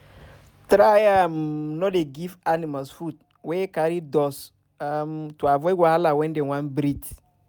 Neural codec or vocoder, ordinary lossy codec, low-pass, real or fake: none; none; none; real